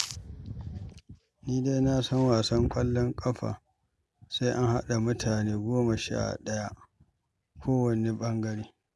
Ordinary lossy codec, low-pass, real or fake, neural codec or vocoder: none; none; real; none